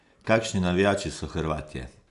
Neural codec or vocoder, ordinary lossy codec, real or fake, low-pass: none; none; real; 10.8 kHz